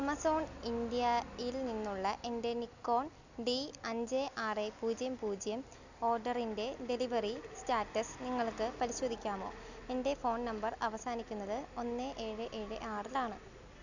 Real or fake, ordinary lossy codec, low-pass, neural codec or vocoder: real; none; 7.2 kHz; none